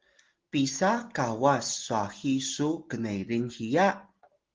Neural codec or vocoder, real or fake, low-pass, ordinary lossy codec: none; real; 7.2 kHz; Opus, 16 kbps